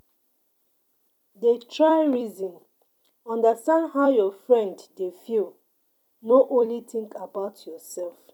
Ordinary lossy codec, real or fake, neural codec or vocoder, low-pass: none; fake; vocoder, 44.1 kHz, 128 mel bands every 256 samples, BigVGAN v2; 19.8 kHz